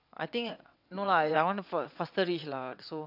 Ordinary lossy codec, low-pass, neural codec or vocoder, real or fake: AAC, 48 kbps; 5.4 kHz; vocoder, 22.05 kHz, 80 mel bands, WaveNeXt; fake